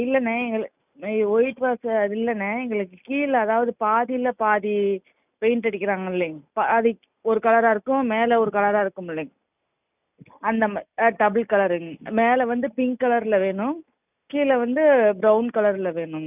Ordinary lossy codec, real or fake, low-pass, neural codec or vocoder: none; real; 3.6 kHz; none